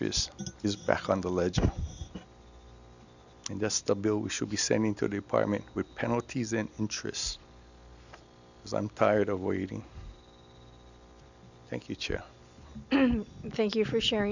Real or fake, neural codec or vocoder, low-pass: real; none; 7.2 kHz